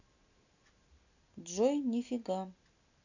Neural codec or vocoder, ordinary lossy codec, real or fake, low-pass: none; none; real; 7.2 kHz